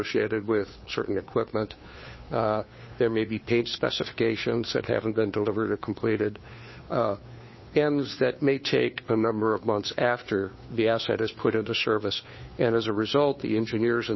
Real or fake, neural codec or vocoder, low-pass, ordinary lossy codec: fake; codec, 16 kHz, 2 kbps, FunCodec, trained on Chinese and English, 25 frames a second; 7.2 kHz; MP3, 24 kbps